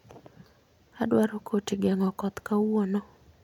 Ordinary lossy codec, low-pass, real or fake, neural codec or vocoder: none; 19.8 kHz; real; none